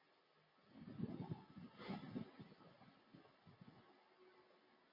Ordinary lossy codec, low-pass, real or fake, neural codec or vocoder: MP3, 24 kbps; 5.4 kHz; real; none